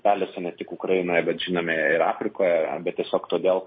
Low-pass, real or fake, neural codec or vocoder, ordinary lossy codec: 7.2 kHz; real; none; MP3, 24 kbps